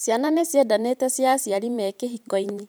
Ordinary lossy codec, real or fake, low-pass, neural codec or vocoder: none; fake; none; vocoder, 44.1 kHz, 128 mel bands every 512 samples, BigVGAN v2